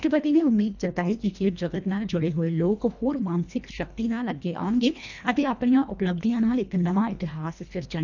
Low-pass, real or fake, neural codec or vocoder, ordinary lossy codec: 7.2 kHz; fake; codec, 24 kHz, 1.5 kbps, HILCodec; none